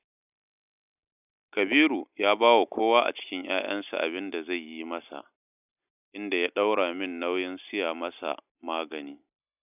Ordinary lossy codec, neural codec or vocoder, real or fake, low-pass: none; none; real; 3.6 kHz